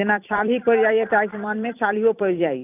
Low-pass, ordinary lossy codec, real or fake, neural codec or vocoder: 3.6 kHz; none; fake; vocoder, 44.1 kHz, 128 mel bands every 256 samples, BigVGAN v2